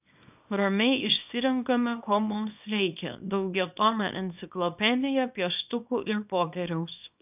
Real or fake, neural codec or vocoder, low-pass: fake; codec, 24 kHz, 0.9 kbps, WavTokenizer, small release; 3.6 kHz